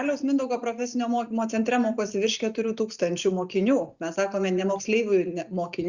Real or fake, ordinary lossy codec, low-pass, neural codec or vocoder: real; Opus, 64 kbps; 7.2 kHz; none